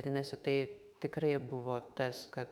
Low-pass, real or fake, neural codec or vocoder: 19.8 kHz; fake; autoencoder, 48 kHz, 32 numbers a frame, DAC-VAE, trained on Japanese speech